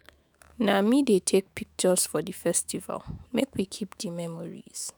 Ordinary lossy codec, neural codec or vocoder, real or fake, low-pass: none; autoencoder, 48 kHz, 128 numbers a frame, DAC-VAE, trained on Japanese speech; fake; none